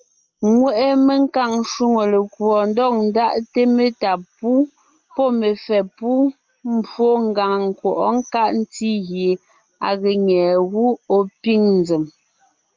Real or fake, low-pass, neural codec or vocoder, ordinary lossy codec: real; 7.2 kHz; none; Opus, 24 kbps